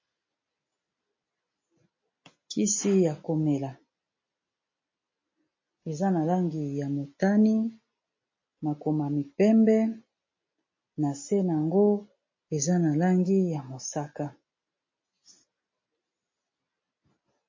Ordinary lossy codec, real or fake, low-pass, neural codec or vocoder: MP3, 32 kbps; real; 7.2 kHz; none